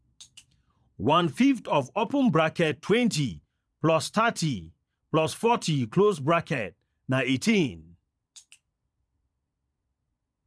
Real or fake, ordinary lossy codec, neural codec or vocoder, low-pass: fake; none; vocoder, 22.05 kHz, 80 mel bands, Vocos; none